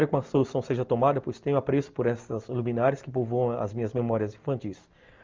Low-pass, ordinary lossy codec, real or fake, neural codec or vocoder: 7.2 kHz; Opus, 32 kbps; real; none